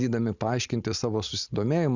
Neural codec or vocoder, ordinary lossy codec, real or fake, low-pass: none; Opus, 64 kbps; real; 7.2 kHz